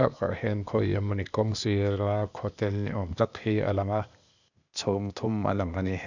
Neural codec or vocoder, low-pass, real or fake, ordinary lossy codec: codec, 16 kHz, 0.8 kbps, ZipCodec; 7.2 kHz; fake; none